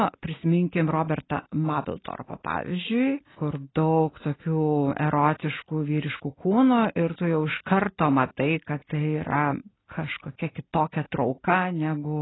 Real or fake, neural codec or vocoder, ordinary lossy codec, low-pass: real; none; AAC, 16 kbps; 7.2 kHz